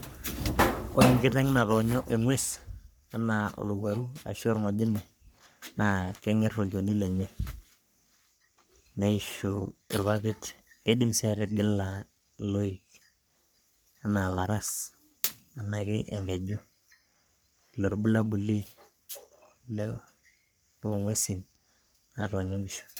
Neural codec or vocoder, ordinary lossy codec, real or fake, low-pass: codec, 44.1 kHz, 3.4 kbps, Pupu-Codec; none; fake; none